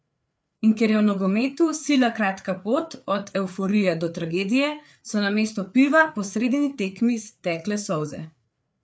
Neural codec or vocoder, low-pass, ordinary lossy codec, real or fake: codec, 16 kHz, 4 kbps, FreqCodec, larger model; none; none; fake